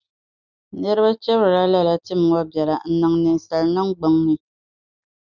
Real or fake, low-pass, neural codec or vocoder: real; 7.2 kHz; none